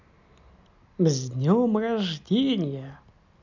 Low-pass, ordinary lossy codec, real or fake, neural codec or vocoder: 7.2 kHz; none; real; none